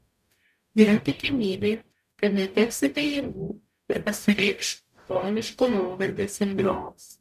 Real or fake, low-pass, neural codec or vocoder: fake; 14.4 kHz; codec, 44.1 kHz, 0.9 kbps, DAC